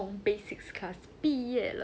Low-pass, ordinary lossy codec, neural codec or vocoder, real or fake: none; none; none; real